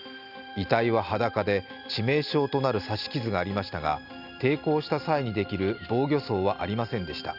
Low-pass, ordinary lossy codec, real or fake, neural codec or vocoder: 5.4 kHz; none; real; none